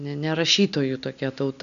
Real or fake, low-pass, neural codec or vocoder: real; 7.2 kHz; none